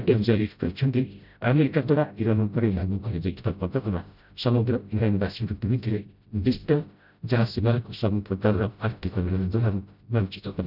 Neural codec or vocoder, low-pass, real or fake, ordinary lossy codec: codec, 16 kHz, 0.5 kbps, FreqCodec, smaller model; 5.4 kHz; fake; none